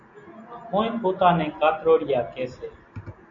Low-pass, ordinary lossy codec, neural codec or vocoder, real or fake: 7.2 kHz; AAC, 64 kbps; none; real